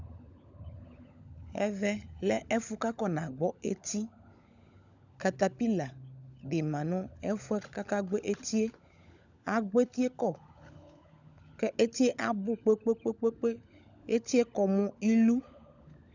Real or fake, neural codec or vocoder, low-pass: fake; codec, 16 kHz, 16 kbps, FunCodec, trained on LibriTTS, 50 frames a second; 7.2 kHz